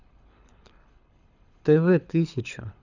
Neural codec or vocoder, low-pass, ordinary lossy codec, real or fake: codec, 24 kHz, 6 kbps, HILCodec; 7.2 kHz; none; fake